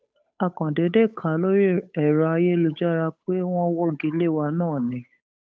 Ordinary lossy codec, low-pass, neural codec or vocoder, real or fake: none; none; codec, 16 kHz, 8 kbps, FunCodec, trained on Chinese and English, 25 frames a second; fake